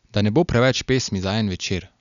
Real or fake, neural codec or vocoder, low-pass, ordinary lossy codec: real; none; 7.2 kHz; none